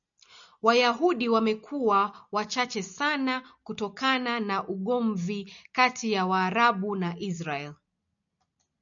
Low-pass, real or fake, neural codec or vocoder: 7.2 kHz; real; none